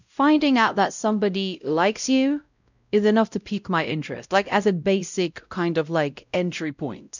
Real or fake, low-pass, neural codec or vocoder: fake; 7.2 kHz; codec, 16 kHz, 0.5 kbps, X-Codec, WavLM features, trained on Multilingual LibriSpeech